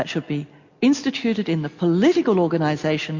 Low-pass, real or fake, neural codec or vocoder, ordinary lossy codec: 7.2 kHz; real; none; MP3, 48 kbps